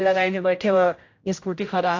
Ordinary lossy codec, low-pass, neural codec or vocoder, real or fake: AAC, 48 kbps; 7.2 kHz; codec, 16 kHz, 0.5 kbps, X-Codec, HuBERT features, trained on general audio; fake